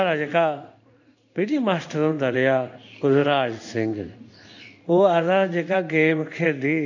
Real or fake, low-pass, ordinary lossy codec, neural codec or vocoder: fake; 7.2 kHz; none; codec, 16 kHz in and 24 kHz out, 1 kbps, XY-Tokenizer